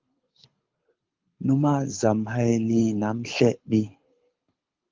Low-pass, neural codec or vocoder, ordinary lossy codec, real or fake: 7.2 kHz; codec, 24 kHz, 6 kbps, HILCodec; Opus, 24 kbps; fake